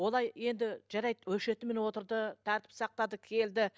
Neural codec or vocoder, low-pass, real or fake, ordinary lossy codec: none; none; real; none